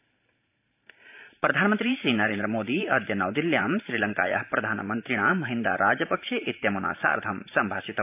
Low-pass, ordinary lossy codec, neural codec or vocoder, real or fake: 3.6 kHz; AAC, 32 kbps; vocoder, 44.1 kHz, 128 mel bands every 512 samples, BigVGAN v2; fake